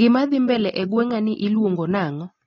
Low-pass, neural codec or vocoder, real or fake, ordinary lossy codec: 7.2 kHz; none; real; AAC, 32 kbps